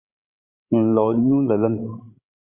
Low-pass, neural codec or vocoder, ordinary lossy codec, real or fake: 3.6 kHz; codec, 16 kHz, 16 kbps, FreqCodec, larger model; Opus, 64 kbps; fake